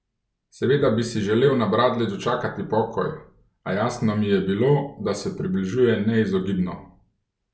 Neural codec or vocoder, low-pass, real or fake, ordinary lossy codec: none; none; real; none